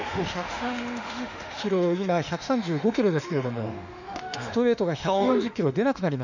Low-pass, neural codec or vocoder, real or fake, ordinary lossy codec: 7.2 kHz; autoencoder, 48 kHz, 32 numbers a frame, DAC-VAE, trained on Japanese speech; fake; none